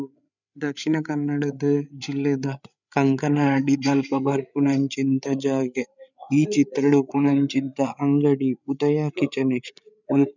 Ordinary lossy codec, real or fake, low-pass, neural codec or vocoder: none; fake; 7.2 kHz; codec, 16 kHz, 8 kbps, FreqCodec, larger model